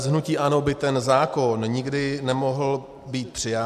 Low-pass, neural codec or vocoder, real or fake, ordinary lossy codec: 14.4 kHz; none; real; AAC, 96 kbps